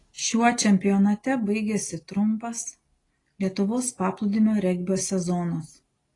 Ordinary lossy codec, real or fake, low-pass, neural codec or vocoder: AAC, 32 kbps; real; 10.8 kHz; none